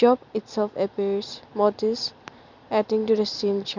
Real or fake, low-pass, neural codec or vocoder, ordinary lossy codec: fake; 7.2 kHz; vocoder, 44.1 kHz, 128 mel bands every 256 samples, BigVGAN v2; none